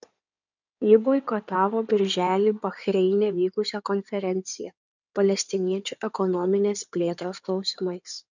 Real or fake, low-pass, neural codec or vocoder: fake; 7.2 kHz; codec, 16 kHz in and 24 kHz out, 2.2 kbps, FireRedTTS-2 codec